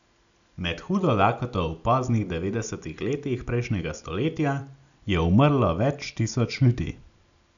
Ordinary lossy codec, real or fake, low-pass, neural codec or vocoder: none; real; 7.2 kHz; none